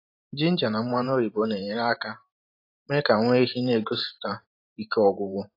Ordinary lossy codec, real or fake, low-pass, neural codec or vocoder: AAC, 32 kbps; fake; 5.4 kHz; vocoder, 44.1 kHz, 128 mel bands every 512 samples, BigVGAN v2